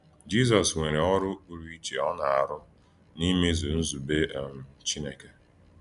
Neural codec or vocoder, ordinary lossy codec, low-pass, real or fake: none; none; 10.8 kHz; real